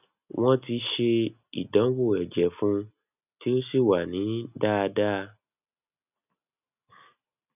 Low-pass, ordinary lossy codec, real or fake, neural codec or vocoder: 3.6 kHz; none; real; none